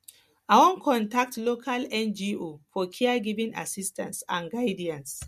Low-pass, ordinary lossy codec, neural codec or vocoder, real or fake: 14.4 kHz; MP3, 64 kbps; none; real